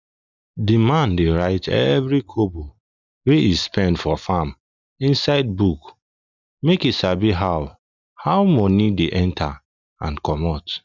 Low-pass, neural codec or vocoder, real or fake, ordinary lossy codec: 7.2 kHz; none; real; none